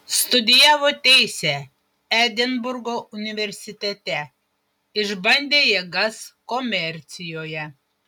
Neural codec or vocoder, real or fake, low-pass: none; real; 19.8 kHz